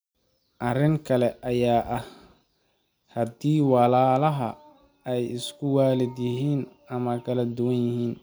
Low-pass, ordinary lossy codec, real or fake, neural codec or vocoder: none; none; real; none